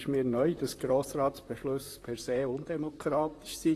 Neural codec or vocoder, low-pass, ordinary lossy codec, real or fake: vocoder, 44.1 kHz, 128 mel bands, Pupu-Vocoder; 14.4 kHz; AAC, 48 kbps; fake